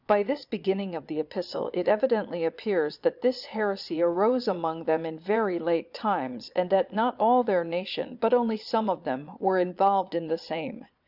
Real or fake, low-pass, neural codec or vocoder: fake; 5.4 kHz; vocoder, 22.05 kHz, 80 mel bands, Vocos